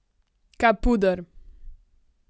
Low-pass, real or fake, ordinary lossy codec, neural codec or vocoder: none; real; none; none